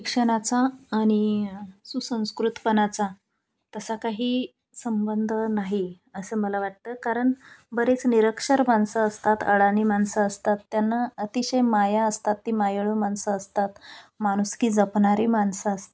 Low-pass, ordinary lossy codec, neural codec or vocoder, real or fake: none; none; none; real